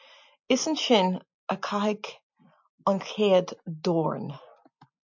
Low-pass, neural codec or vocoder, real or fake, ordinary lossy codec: 7.2 kHz; none; real; MP3, 48 kbps